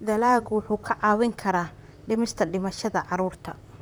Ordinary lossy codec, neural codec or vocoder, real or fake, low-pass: none; vocoder, 44.1 kHz, 128 mel bands, Pupu-Vocoder; fake; none